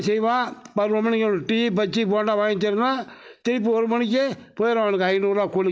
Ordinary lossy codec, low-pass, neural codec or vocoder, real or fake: none; none; none; real